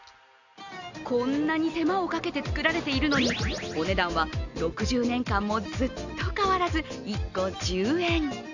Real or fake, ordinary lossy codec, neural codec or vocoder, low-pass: real; none; none; 7.2 kHz